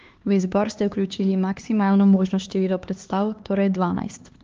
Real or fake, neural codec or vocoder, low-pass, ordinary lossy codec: fake; codec, 16 kHz, 2 kbps, X-Codec, HuBERT features, trained on LibriSpeech; 7.2 kHz; Opus, 32 kbps